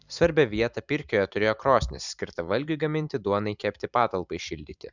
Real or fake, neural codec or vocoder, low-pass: fake; vocoder, 44.1 kHz, 128 mel bands every 512 samples, BigVGAN v2; 7.2 kHz